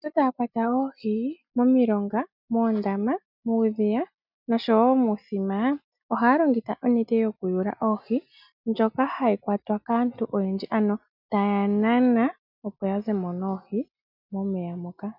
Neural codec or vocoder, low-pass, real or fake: none; 5.4 kHz; real